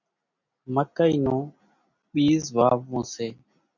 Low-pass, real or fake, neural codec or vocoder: 7.2 kHz; real; none